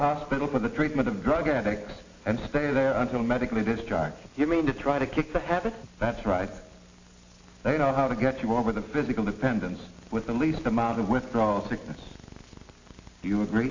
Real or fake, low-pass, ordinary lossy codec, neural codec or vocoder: real; 7.2 kHz; AAC, 48 kbps; none